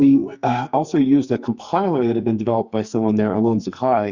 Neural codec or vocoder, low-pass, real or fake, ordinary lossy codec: codec, 44.1 kHz, 2.6 kbps, SNAC; 7.2 kHz; fake; Opus, 64 kbps